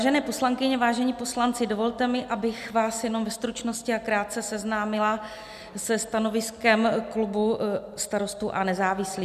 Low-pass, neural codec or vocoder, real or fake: 14.4 kHz; none; real